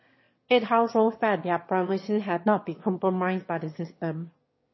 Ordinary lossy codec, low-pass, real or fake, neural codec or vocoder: MP3, 24 kbps; 7.2 kHz; fake; autoencoder, 22.05 kHz, a latent of 192 numbers a frame, VITS, trained on one speaker